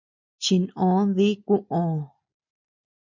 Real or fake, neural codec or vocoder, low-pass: real; none; 7.2 kHz